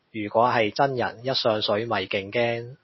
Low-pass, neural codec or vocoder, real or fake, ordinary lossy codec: 7.2 kHz; none; real; MP3, 24 kbps